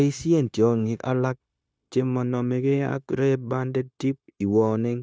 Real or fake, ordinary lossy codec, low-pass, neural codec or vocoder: fake; none; none; codec, 16 kHz, 0.9 kbps, LongCat-Audio-Codec